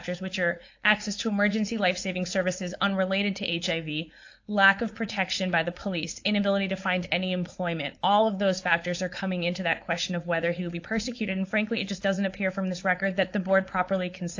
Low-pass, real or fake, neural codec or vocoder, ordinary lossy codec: 7.2 kHz; fake; codec, 16 kHz, 4.8 kbps, FACodec; AAC, 48 kbps